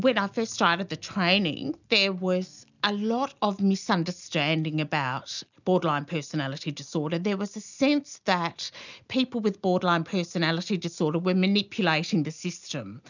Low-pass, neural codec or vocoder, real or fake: 7.2 kHz; none; real